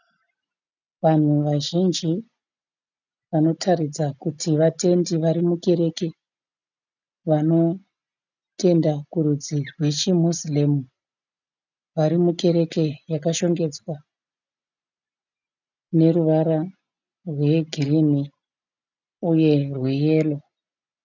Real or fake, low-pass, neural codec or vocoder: real; 7.2 kHz; none